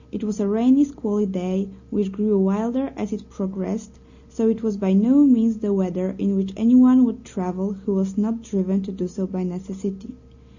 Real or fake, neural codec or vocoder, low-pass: real; none; 7.2 kHz